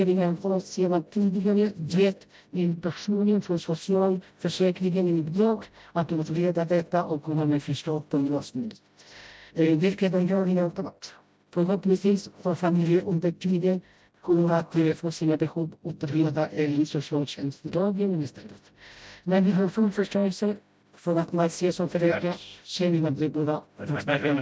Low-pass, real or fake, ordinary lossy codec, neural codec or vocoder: none; fake; none; codec, 16 kHz, 0.5 kbps, FreqCodec, smaller model